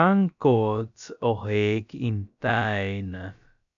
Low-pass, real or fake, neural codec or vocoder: 7.2 kHz; fake; codec, 16 kHz, about 1 kbps, DyCAST, with the encoder's durations